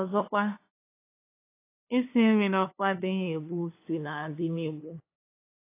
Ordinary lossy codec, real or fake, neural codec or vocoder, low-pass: AAC, 24 kbps; fake; codec, 16 kHz, 2 kbps, FunCodec, trained on LibriTTS, 25 frames a second; 3.6 kHz